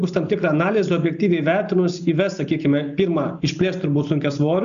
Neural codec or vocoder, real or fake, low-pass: none; real; 7.2 kHz